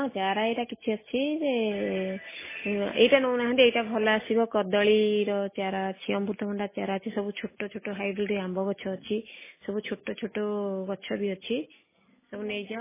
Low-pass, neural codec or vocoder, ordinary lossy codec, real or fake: 3.6 kHz; none; MP3, 16 kbps; real